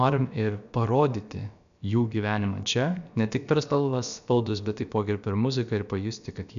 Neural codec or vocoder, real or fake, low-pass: codec, 16 kHz, about 1 kbps, DyCAST, with the encoder's durations; fake; 7.2 kHz